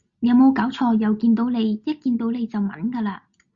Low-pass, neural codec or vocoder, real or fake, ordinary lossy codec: 7.2 kHz; none; real; Opus, 64 kbps